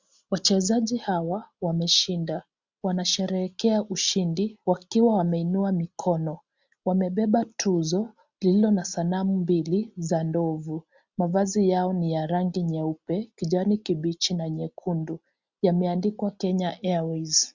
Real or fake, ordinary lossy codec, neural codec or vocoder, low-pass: real; Opus, 64 kbps; none; 7.2 kHz